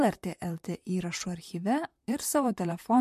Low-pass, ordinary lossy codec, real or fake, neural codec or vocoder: 14.4 kHz; MP3, 64 kbps; fake; vocoder, 44.1 kHz, 128 mel bands, Pupu-Vocoder